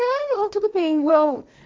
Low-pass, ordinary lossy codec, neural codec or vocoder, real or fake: none; none; codec, 16 kHz, 1.1 kbps, Voila-Tokenizer; fake